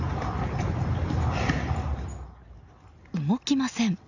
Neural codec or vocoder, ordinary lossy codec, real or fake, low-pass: none; none; real; 7.2 kHz